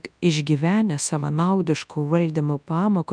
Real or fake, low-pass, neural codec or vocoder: fake; 9.9 kHz; codec, 24 kHz, 0.9 kbps, WavTokenizer, large speech release